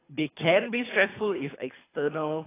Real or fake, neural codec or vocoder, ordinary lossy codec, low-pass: fake; codec, 24 kHz, 3 kbps, HILCodec; AAC, 16 kbps; 3.6 kHz